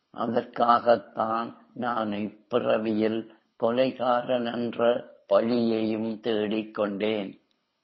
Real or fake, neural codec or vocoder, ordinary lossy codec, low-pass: fake; codec, 24 kHz, 6 kbps, HILCodec; MP3, 24 kbps; 7.2 kHz